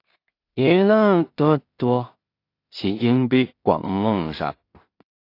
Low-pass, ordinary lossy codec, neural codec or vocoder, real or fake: 5.4 kHz; AAC, 32 kbps; codec, 16 kHz in and 24 kHz out, 0.4 kbps, LongCat-Audio-Codec, two codebook decoder; fake